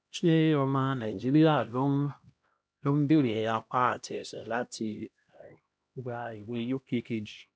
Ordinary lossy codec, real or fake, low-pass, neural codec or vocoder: none; fake; none; codec, 16 kHz, 1 kbps, X-Codec, HuBERT features, trained on LibriSpeech